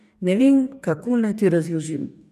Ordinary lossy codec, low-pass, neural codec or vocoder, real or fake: none; 14.4 kHz; codec, 44.1 kHz, 2.6 kbps, DAC; fake